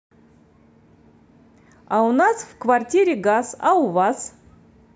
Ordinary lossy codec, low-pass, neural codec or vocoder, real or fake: none; none; none; real